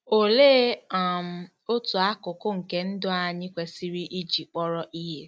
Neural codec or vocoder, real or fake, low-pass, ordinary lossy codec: none; real; none; none